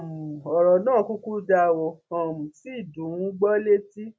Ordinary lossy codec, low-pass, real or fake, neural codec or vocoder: none; none; real; none